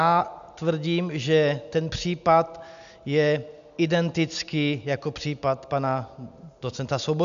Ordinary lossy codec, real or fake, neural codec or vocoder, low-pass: AAC, 96 kbps; real; none; 7.2 kHz